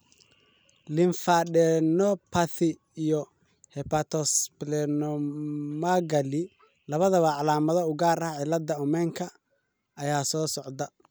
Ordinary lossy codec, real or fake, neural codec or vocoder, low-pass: none; real; none; none